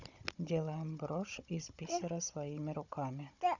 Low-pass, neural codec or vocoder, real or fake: 7.2 kHz; codec, 16 kHz, 16 kbps, FunCodec, trained on Chinese and English, 50 frames a second; fake